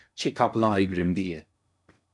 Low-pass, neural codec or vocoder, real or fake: 10.8 kHz; codec, 16 kHz in and 24 kHz out, 0.8 kbps, FocalCodec, streaming, 65536 codes; fake